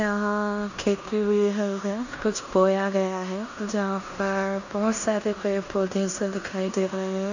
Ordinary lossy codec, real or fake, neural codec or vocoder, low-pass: none; fake; codec, 16 kHz in and 24 kHz out, 0.9 kbps, LongCat-Audio-Codec, fine tuned four codebook decoder; 7.2 kHz